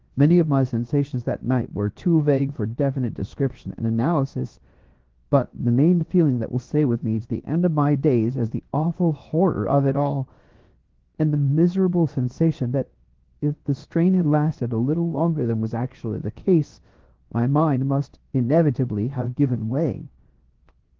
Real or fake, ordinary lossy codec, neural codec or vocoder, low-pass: fake; Opus, 16 kbps; codec, 16 kHz, 0.7 kbps, FocalCodec; 7.2 kHz